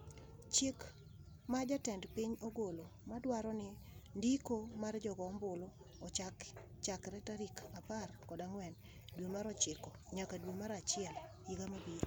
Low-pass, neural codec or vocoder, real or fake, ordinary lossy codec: none; none; real; none